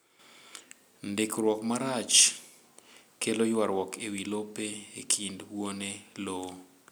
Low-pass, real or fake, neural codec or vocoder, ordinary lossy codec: none; real; none; none